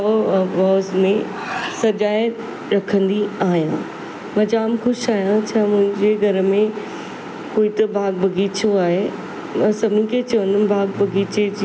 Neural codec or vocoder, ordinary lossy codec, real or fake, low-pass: none; none; real; none